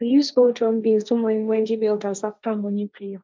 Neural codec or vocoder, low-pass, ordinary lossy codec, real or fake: codec, 16 kHz, 1.1 kbps, Voila-Tokenizer; none; none; fake